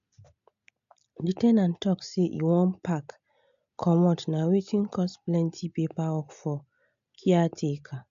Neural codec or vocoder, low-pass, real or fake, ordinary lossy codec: none; 7.2 kHz; real; MP3, 64 kbps